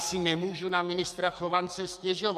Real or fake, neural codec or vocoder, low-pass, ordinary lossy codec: fake; codec, 44.1 kHz, 2.6 kbps, SNAC; 14.4 kHz; MP3, 96 kbps